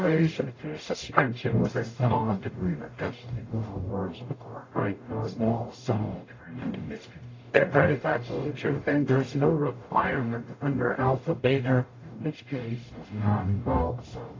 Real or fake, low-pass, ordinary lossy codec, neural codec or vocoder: fake; 7.2 kHz; AAC, 32 kbps; codec, 44.1 kHz, 0.9 kbps, DAC